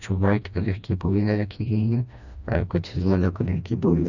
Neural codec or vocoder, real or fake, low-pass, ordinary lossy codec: codec, 16 kHz, 1 kbps, FreqCodec, smaller model; fake; 7.2 kHz; none